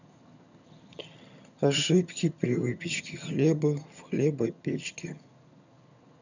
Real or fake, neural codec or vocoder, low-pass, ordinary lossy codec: fake; vocoder, 22.05 kHz, 80 mel bands, HiFi-GAN; 7.2 kHz; none